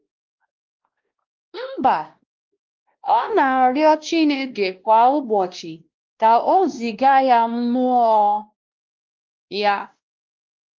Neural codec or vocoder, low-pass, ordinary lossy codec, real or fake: codec, 16 kHz, 1 kbps, X-Codec, WavLM features, trained on Multilingual LibriSpeech; 7.2 kHz; Opus, 32 kbps; fake